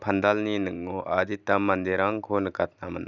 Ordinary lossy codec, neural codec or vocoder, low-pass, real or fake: none; none; 7.2 kHz; real